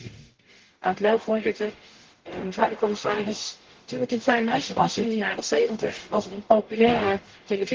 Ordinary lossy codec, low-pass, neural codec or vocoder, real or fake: Opus, 16 kbps; 7.2 kHz; codec, 44.1 kHz, 0.9 kbps, DAC; fake